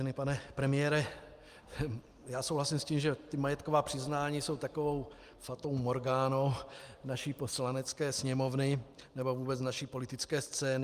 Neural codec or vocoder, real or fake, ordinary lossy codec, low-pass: none; real; Opus, 32 kbps; 14.4 kHz